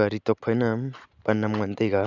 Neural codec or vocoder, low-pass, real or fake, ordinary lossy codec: none; 7.2 kHz; real; none